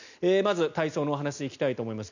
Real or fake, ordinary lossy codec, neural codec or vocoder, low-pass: real; none; none; 7.2 kHz